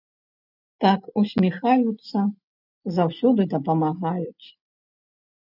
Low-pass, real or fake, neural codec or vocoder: 5.4 kHz; real; none